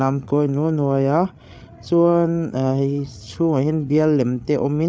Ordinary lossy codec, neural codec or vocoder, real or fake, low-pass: none; codec, 16 kHz, 8 kbps, FreqCodec, larger model; fake; none